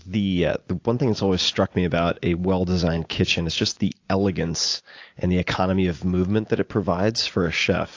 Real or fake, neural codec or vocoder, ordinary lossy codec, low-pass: real; none; AAC, 48 kbps; 7.2 kHz